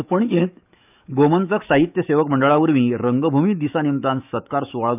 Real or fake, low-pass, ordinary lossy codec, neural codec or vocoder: fake; 3.6 kHz; none; autoencoder, 48 kHz, 128 numbers a frame, DAC-VAE, trained on Japanese speech